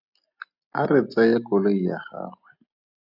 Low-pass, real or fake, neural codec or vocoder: 5.4 kHz; real; none